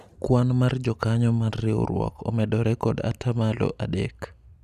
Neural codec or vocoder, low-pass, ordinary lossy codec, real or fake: none; 14.4 kHz; none; real